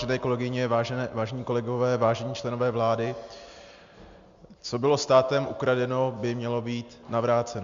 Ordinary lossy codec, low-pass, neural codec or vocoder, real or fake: MP3, 64 kbps; 7.2 kHz; none; real